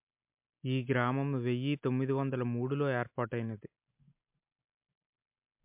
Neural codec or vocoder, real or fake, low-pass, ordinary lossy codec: none; real; 3.6 kHz; MP3, 32 kbps